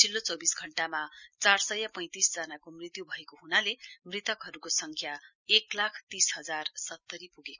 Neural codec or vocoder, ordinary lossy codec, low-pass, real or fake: none; none; 7.2 kHz; real